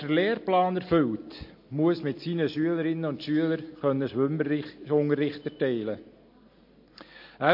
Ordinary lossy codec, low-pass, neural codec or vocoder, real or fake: MP3, 32 kbps; 5.4 kHz; none; real